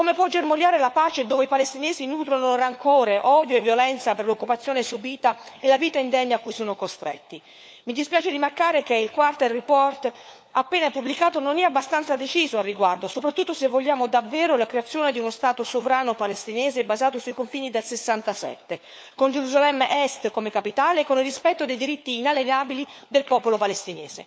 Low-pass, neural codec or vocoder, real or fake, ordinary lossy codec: none; codec, 16 kHz, 4 kbps, FunCodec, trained on LibriTTS, 50 frames a second; fake; none